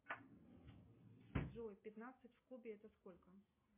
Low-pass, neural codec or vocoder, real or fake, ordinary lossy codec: 3.6 kHz; none; real; MP3, 24 kbps